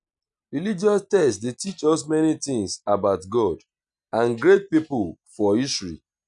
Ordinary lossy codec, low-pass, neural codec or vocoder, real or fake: none; 9.9 kHz; none; real